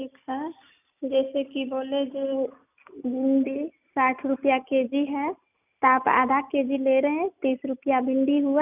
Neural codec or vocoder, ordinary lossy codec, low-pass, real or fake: none; none; 3.6 kHz; real